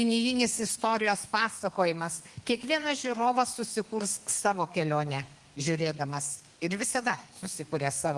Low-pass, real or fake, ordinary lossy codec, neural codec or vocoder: 10.8 kHz; fake; Opus, 24 kbps; codec, 32 kHz, 1.9 kbps, SNAC